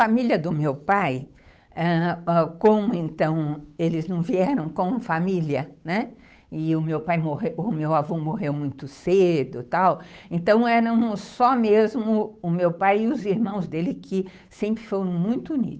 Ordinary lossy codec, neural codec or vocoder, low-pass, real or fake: none; codec, 16 kHz, 8 kbps, FunCodec, trained on Chinese and English, 25 frames a second; none; fake